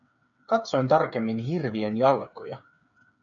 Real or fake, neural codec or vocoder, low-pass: fake; codec, 16 kHz, 8 kbps, FreqCodec, smaller model; 7.2 kHz